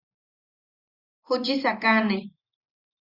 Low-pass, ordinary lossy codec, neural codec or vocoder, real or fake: 5.4 kHz; Opus, 64 kbps; none; real